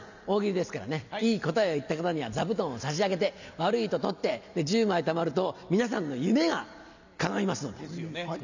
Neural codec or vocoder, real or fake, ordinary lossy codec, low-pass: none; real; none; 7.2 kHz